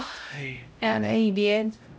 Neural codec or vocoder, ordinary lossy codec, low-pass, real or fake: codec, 16 kHz, 0.5 kbps, X-Codec, HuBERT features, trained on LibriSpeech; none; none; fake